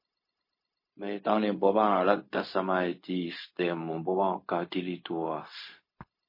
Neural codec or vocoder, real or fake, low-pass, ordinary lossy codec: codec, 16 kHz, 0.4 kbps, LongCat-Audio-Codec; fake; 5.4 kHz; MP3, 24 kbps